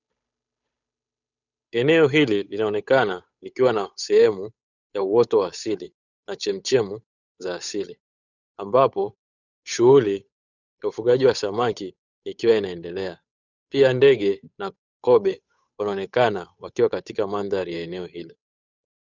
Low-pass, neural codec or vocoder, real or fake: 7.2 kHz; codec, 16 kHz, 8 kbps, FunCodec, trained on Chinese and English, 25 frames a second; fake